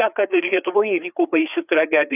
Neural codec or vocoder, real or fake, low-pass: codec, 16 kHz, 4 kbps, FreqCodec, larger model; fake; 3.6 kHz